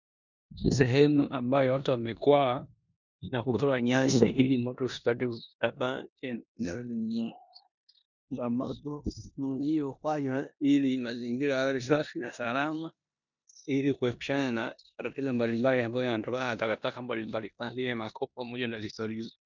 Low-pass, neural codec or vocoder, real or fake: 7.2 kHz; codec, 16 kHz in and 24 kHz out, 0.9 kbps, LongCat-Audio-Codec, four codebook decoder; fake